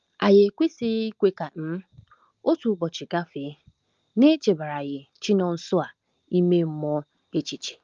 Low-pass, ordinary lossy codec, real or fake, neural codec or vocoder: 7.2 kHz; Opus, 32 kbps; real; none